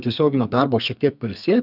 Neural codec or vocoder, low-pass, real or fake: codec, 32 kHz, 1.9 kbps, SNAC; 5.4 kHz; fake